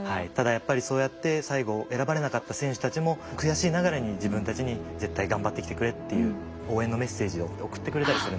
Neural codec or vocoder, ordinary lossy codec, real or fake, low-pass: none; none; real; none